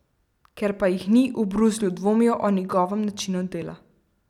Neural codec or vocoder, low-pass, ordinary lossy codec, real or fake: none; 19.8 kHz; none; real